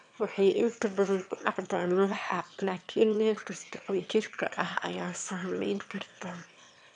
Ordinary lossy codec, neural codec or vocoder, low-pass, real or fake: none; autoencoder, 22.05 kHz, a latent of 192 numbers a frame, VITS, trained on one speaker; 9.9 kHz; fake